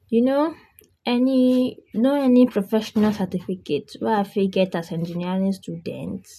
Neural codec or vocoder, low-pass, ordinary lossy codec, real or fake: none; 14.4 kHz; none; real